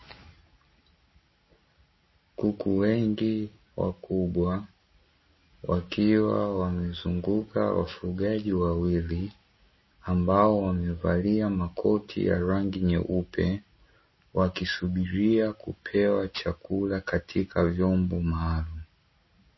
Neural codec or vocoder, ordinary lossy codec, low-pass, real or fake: none; MP3, 24 kbps; 7.2 kHz; real